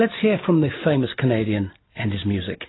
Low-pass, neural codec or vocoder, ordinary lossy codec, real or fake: 7.2 kHz; none; AAC, 16 kbps; real